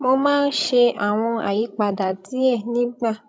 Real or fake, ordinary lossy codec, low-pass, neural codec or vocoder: fake; none; none; codec, 16 kHz, 16 kbps, FreqCodec, larger model